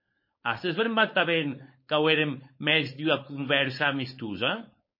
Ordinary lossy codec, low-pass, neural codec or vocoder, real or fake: MP3, 24 kbps; 5.4 kHz; codec, 16 kHz, 4.8 kbps, FACodec; fake